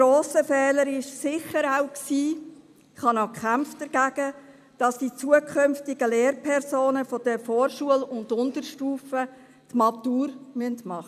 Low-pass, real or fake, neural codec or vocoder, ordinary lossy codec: 14.4 kHz; real; none; none